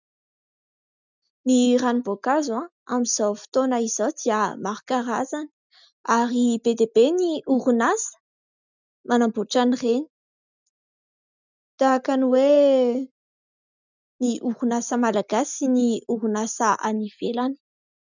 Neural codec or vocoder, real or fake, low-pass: none; real; 7.2 kHz